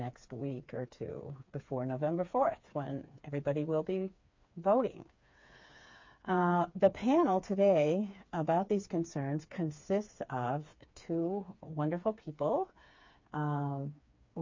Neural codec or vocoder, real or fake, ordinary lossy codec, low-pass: codec, 16 kHz, 4 kbps, FreqCodec, smaller model; fake; MP3, 48 kbps; 7.2 kHz